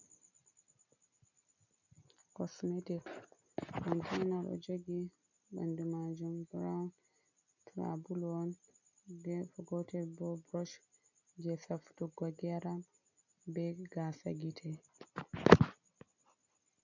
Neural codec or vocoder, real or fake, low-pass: none; real; 7.2 kHz